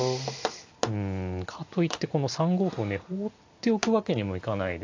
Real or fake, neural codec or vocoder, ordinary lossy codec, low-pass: real; none; AAC, 48 kbps; 7.2 kHz